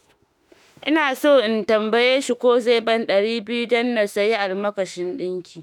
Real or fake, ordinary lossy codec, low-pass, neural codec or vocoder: fake; none; 19.8 kHz; autoencoder, 48 kHz, 32 numbers a frame, DAC-VAE, trained on Japanese speech